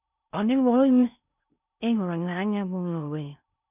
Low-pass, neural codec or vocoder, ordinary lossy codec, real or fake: 3.6 kHz; codec, 16 kHz in and 24 kHz out, 0.6 kbps, FocalCodec, streaming, 2048 codes; none; fake